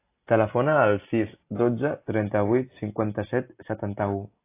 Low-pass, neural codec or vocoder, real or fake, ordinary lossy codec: 3.6 kHz; none; real; AAC, 24 kbps